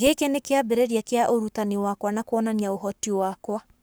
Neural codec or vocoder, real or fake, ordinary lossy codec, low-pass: codec, 44.1 kHz, 7.8 kbps, Pupu-Codec; fake; none; none